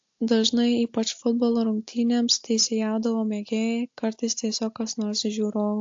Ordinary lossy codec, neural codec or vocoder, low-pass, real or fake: AAC, 48 kbps; none; 7.2 kHz; real